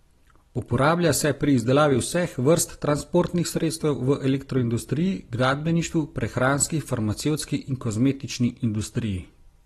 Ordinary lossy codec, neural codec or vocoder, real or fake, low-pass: AAC, 32 kbps; none; real; 19.8 kHz